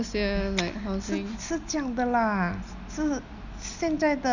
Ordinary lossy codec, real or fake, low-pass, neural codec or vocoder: none; real; 7.2 kHz; none